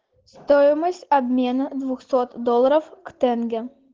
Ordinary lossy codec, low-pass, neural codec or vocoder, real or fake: Opus, 16 kbps; 7.2 kHz; none; real